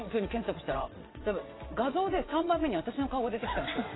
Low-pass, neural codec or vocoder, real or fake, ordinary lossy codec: 7.2 kHz; vocoder, 22.05 kHz, 80 mel bands, WaveNeXt; fake; AAC, 16 kbps